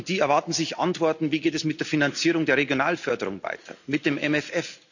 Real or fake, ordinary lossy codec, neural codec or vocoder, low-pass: real; none; none; 7.2 kHz